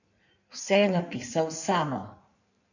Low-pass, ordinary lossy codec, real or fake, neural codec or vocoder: 7.2 kHz; none; fake; codec, 16 kHz in and 24 kHz out, 1.1 kbps, FireRedTTS-2 codec